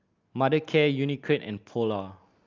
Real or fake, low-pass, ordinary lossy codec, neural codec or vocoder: real; 7.2 kHz; Opus, 24 kbps; none